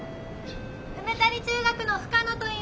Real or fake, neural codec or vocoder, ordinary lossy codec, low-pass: real; none; none; none